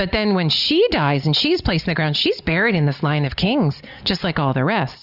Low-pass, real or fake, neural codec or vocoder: 5.4 kHz; real; none